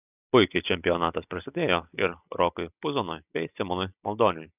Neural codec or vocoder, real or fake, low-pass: none; real; 3.6 kHz